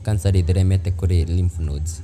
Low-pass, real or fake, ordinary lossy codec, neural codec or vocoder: 14.4 kHz; real; none; none